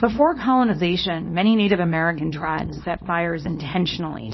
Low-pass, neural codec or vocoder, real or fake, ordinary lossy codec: 7.2 kHz; codec, 24 kHz, 0.9 kbps, WavTokenizer, small release; fake; MP3, 24 kbps